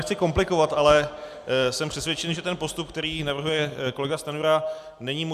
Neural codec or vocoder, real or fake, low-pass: none; real; 14.4 kHz